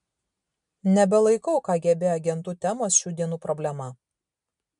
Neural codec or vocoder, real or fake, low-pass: none; real; 10.8 kHz